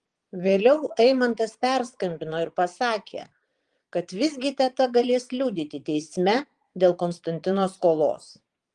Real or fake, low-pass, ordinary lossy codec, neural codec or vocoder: fake; 9.9 kHz; Opus, 24 kbps; vocoder, 22.05 kHz, 80 mel bands, Vocos